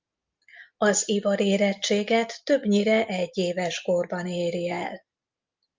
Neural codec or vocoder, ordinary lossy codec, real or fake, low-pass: none; Opus, 24 kbps; real; 7.2 kHz